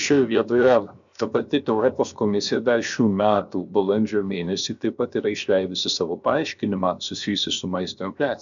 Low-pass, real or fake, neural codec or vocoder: 7.2 kHz; fake; codec, 16 kHz, 0.7 kbps, FocalCodec